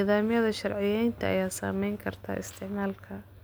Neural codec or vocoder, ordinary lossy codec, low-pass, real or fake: none; none; none; real